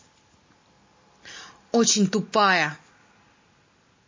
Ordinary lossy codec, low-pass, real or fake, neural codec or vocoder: MP3, 32 kbps; 7.2 kHz; real; none